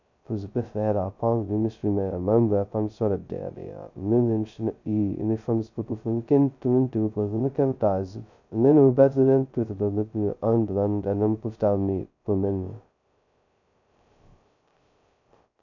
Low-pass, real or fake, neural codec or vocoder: 7.2 kHz; fake; codec, 16 kHz, 0.2 kbps, FocalCodec